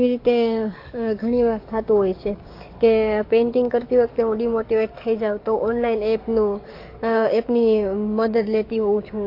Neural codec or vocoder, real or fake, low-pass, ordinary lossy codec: codec, 44.1 kHz, 7.8 kbps, DAC; fake; 5.4 kHz; AAC, 32 kbps